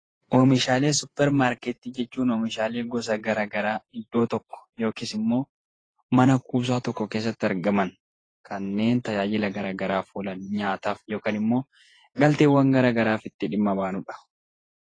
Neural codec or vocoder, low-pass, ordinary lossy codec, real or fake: vocoder, 48 kHz, 128 mel bands, Vocos; 9.9 kHz; AAC, 32 kbps; fake